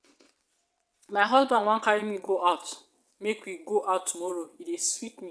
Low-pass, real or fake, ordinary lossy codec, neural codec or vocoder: none; fake; none; vocoder, 22.05 kHz, 80 mel bands, WaveNeXt